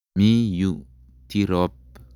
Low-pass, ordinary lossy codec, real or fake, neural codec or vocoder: 19.8 kHz; none; real; none